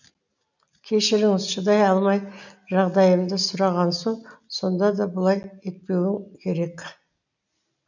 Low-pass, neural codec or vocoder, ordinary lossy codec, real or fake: 7.2 kHz; none; none; real